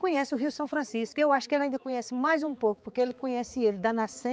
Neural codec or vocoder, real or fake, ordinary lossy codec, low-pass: codec, 16 kHz, 4 kbps, X-Codec, HuBERT features, trained on balanced general audio; fake; none; none